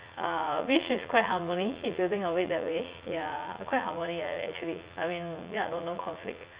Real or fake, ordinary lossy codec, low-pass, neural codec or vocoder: fake; Opus, 64 kbps; 3.6 kHz; vocoder, 44.1 kHz, 80 mel bands, Vocos